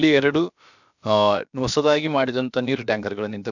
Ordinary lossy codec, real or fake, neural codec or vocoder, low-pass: none; fake; codec, 16 kHz, about 1 kbps, DyCAST, with the encoder's durations; 7.2 kHz